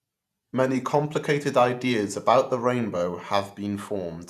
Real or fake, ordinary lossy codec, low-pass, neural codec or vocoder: fake; none; 14.4 kHz; vocoder, 48 kHz, 128 mel bands, Vocos